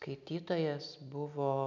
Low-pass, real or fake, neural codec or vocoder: 7.2 kHz; real; none